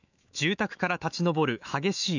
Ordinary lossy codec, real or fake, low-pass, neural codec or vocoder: none; fake; 7.2 kHz; autoencoder, 48 kHz, 128 numbers a frame, DAC-VAE, trained on Japanese speech